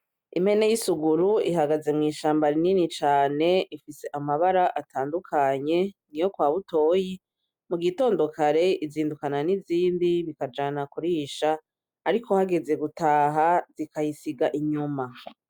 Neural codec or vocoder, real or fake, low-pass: none; real; 19.8 kHz